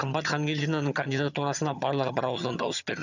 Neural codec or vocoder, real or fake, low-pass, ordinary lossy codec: vocoder, 22.05 kHz, 80 mel bands, HiFi-GAN; fake; 7.2 kHz; none